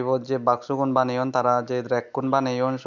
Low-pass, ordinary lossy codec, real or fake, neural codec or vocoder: 7.2 kHz; none; real; none